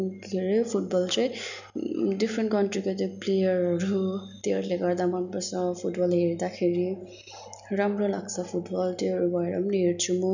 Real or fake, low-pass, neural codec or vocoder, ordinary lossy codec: real; 7.2 kHz; none; none